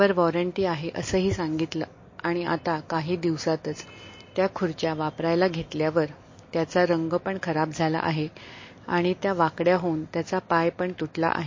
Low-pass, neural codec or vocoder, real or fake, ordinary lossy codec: 7.2 kHz; none; real; MP3, 32 kbps